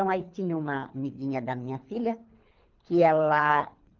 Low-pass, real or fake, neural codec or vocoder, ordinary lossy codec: 7.2 kHz; fake; codec, 24 kHz, 3 kbps, HILCodec; Opus, 24 kbps